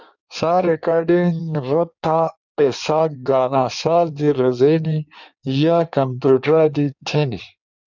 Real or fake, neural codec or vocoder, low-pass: fake; codec, 16 kHz in and 24 kHz out, 1.1 kbps, FireRedTTS-2 codec; 7.2 kHz